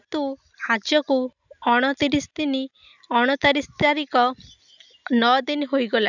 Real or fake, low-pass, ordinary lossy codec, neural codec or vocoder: real; 7.2 kHz; none; none